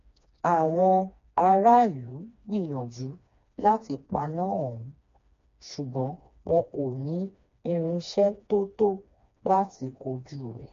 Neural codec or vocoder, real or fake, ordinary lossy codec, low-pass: codec, 16 kHz, 2 kbps, FreqCodec, smaller model; fake; MP3, 48 kbps; 7.2 kHz